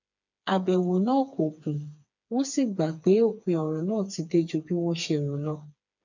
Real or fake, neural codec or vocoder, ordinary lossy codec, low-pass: fake; codec, 16 kHz, 4 kbps, FreqCodec, smaller model; none; 7.2 kHz